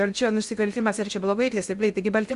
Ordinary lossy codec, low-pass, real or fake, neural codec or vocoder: Opus, 64 kbps; 10.8 kHz; fake; codec, 16 kHz in and 24 kHz out, 0.6 kbps, FocalCodec, streaming, 2048 codes